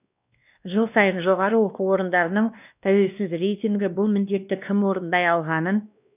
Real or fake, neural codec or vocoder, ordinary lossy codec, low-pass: fake; codec, 16 kHz, 1 kbps, X-Codec, WavLM features, trained on Multilingual LibriSpeech; none; 3.6 kHz